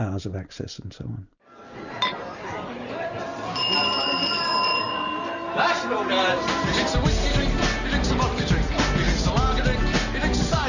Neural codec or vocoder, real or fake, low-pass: none; real; 7.2 kHz